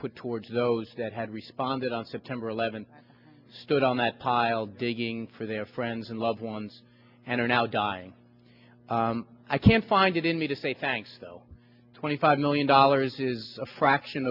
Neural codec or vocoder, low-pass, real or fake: none; 5.4 kHz; real